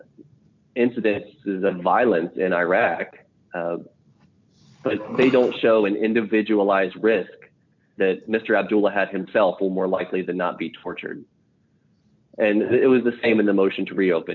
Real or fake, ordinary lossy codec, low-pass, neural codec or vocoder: real; MP3, 64 kbps; 7.2 kHz; none